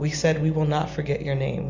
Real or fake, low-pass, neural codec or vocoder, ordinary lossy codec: real; 7.2 kHz; none; Opus, 64 kbps